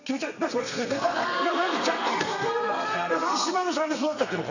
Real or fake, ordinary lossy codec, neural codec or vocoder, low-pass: fake; none; codec, 32 kHz, 1.9 kbps, SNAC; 7.2 kHz